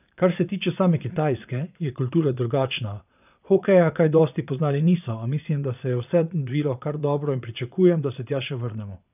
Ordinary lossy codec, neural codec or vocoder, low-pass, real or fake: none; vocoder, 22.05 kHz, 80 mel bands, Vocos; 3.6 kHz; fake